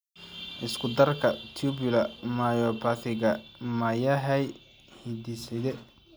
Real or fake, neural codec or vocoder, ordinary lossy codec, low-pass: real; none; none; none